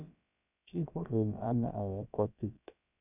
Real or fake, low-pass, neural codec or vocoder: fake; 3.6 kHz; codec, 16 kHz, about 1 kbps, DyCAST, with the encoder's durations